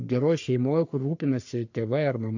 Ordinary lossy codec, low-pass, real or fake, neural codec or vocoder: AAC, 48 kbps; 7.2 kHz; fake; codec, 44.1 kHz, 3.4 kbps, Pupu-Codec